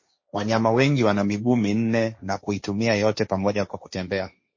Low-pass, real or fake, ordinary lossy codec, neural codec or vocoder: 7.2 kHz; fake; MP3, 32 kbps; codec, 16 kHz, 1.1 kbps, Voila-Tokenizer